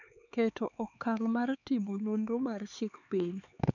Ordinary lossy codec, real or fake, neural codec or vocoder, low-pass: none; fake; codec, 16 kHz, 4 kbps, X-Codec, HuBERT features, trained on LibriSpeech; 7.2 kHz